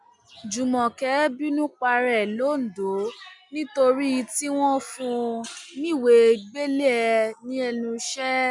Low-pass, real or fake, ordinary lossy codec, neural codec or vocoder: 10.8 kHz; real; none; none